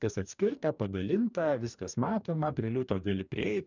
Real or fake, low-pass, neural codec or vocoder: fake; 7.2 kHz; codec, 44.1 kHz, 2.6 kbps, DAC